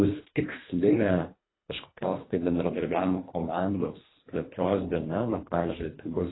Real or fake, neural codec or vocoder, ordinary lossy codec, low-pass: fake; codec, 24 kHz, 1.5 kbps, HILCodec; AAC, 16 kbps; 7.2 kHz